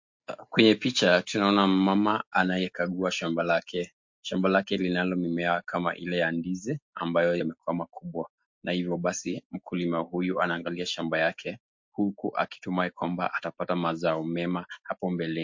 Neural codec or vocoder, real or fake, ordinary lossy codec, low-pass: none; real; MP3, 48 kbps; 7.2 kHz